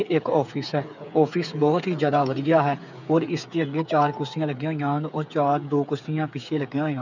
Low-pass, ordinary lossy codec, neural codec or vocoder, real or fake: 7.2 kHz; none; codec, 16 kHz, 8 kbps, FreqCodec, smaller model; fake